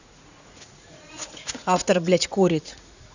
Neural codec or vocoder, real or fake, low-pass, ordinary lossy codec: vocoder, 44.1 kHz, 80 mel bands, Vocos; fake; 7.2 kHz; none